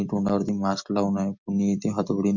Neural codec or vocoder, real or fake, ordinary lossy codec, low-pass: none; real; none; 7.2 kHz